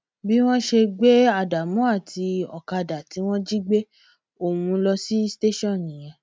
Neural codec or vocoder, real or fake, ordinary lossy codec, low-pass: none; real; none; none